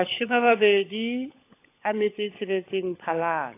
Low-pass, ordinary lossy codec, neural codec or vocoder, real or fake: 3.6 kHz; AAC, 24 kbps; codec, 16 kHz, 16 kbps, FunCodec, trained on LibriTTS, 50 frames a second; fake